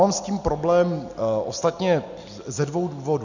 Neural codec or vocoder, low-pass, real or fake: none; 7.2 kHz; real